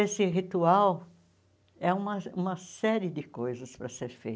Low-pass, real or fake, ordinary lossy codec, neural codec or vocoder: none; real; none; none